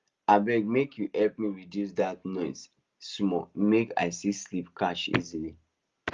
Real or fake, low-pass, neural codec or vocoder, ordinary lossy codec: real; 7.2 kHz; none; Opus, 32 kbps